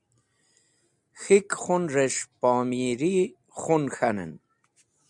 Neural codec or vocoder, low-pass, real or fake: none; 10.8 kHz; real